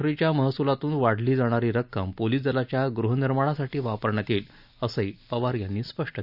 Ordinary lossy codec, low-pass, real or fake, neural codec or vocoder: none; 5.4 kHz; real; none